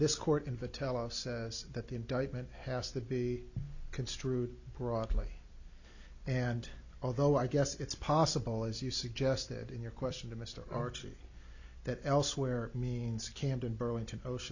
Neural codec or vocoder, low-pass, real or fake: none; 7.2 kHz; real